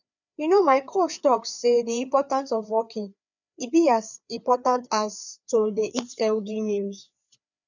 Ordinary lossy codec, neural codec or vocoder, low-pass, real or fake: none; codec, 16 kHz, 4 kbps, FreqCodec, larger model; 7.2 kHz; fake